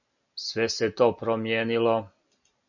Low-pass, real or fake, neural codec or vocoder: 7.2 kHz; real; none